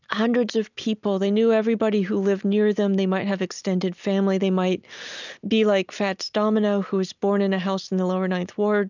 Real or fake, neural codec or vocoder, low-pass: real; none; 7.2 kHz